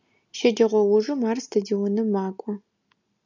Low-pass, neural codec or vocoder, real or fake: 7.2 kHz; none; real